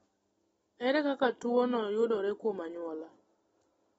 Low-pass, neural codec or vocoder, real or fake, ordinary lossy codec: 19.8 kHz; none; real; AAC, 24 kbps